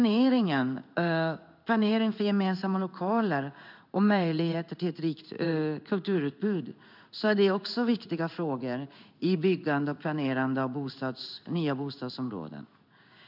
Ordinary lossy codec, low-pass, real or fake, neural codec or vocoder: none; 5.4 kHz; fake; codec, 16 kHz in and 24 kHz out, 1 kbps, XY-Tokenizer